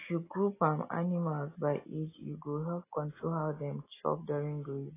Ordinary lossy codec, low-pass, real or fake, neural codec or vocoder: AAC, 16 kbps; 3.6 kHz; real; none